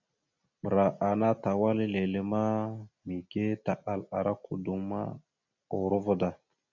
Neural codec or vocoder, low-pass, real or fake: none; 7.2 kHz; real